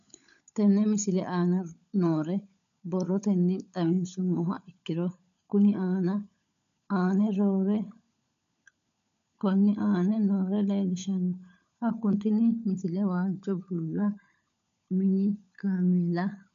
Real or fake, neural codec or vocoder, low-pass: fake; codec, 16 kHz, 16 kbps, FunCodec, trained on LibriTTS, 50 frames a second; 7.2 kHz